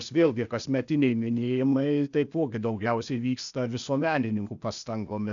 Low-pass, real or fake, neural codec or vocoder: 7.2 kHz; fake; codec, 16 kHz, 0.8 kbps, ZipCodec